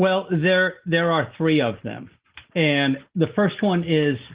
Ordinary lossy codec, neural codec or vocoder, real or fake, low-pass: Opus, 16 kbps; none; real; 3.6 kHz